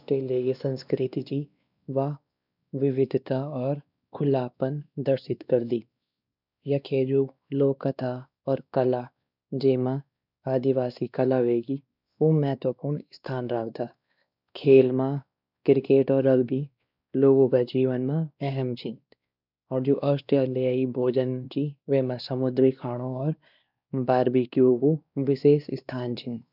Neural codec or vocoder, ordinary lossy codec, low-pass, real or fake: codec, 16 kHz, 2 kbps, X-Codec, WavLM features, trained on Multilingual LibriSpeech; none; 5.4 kHz; fake